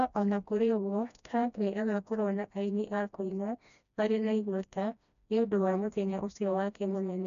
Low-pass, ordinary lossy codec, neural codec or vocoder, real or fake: 7.2 kHz; none; codec, 16 kHz, 1 kbps, FreqCodec, smaller model; fake